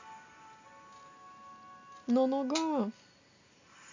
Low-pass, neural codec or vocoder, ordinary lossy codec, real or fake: 7.2 kHz; none; MP3, 64 kbps; real